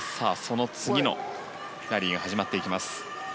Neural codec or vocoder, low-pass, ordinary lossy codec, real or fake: none; none; none; real